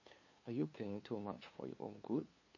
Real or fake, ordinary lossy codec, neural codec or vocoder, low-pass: fake; MP3, 32 kbps; codec, 16 kHz, 2 kbps, FunCodec, trained on LibriTTS, 25 frames a second; 7.2 kHz